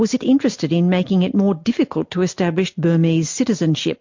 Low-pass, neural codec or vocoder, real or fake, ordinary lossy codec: 7.2 kHz; none; real; MP3, 48 kbps